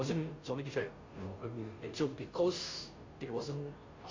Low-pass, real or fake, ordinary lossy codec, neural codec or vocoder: 7.2 kHz; fake; MP3, 48 kbps; codec, 16 kHz, 0.5 kbps, FunCodec, trained on Chinese and English, 25 frames a second